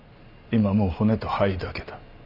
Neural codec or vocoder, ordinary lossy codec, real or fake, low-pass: none; none; real; 5.4 kHz